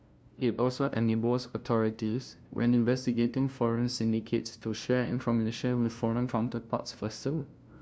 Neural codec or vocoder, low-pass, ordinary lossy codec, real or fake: codec, 16 kHz, 0.5 kbps, FunCodec, trained on LibriTTS, 25 frames a second; none; none; fake